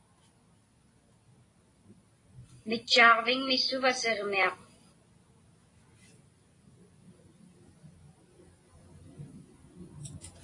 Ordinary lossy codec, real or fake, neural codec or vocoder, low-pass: AAC, 32 kbps; real; none; 10.8 kHz